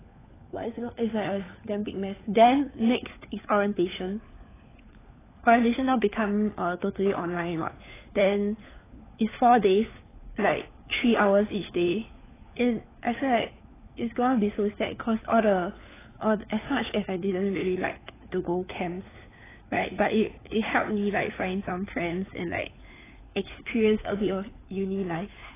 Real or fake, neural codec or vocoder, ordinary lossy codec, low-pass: fake; codec, 16 kHz, 4 kbps, FunCodec, trained on LibriTTS, 50 frames a second; AAC, 16 kbps; 3.6 kHz